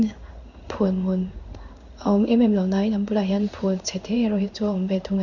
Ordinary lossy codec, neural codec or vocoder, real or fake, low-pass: none; codec, 16 kHz in and 24 kHz out, 1 kbps, XY-Tokenizer; fake; 7.2 kHz